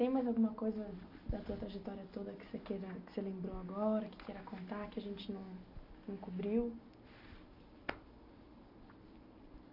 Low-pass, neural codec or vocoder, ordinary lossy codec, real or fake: 5.4 kHz; none; none; real